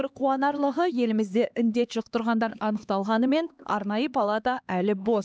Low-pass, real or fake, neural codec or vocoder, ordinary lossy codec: none; fake; codec, 16 kHz, 2 kbps, X-Codec, HuBERT features, trained on LibriSpeech; none